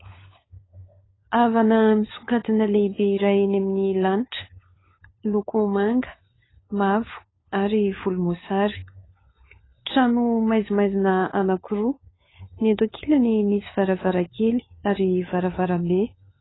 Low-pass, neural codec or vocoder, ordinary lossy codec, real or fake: 7.2 kHz; codec, 16 kHz, 4 kbps, FunCodec, trained on LibriTTS, 50 frames a second; AAC, 16 kbps; fake